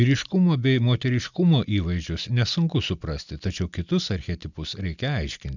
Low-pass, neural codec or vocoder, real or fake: 7.2 kHz; none; real